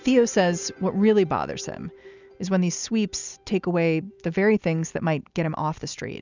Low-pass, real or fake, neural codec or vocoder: 7.2 kHz; real; none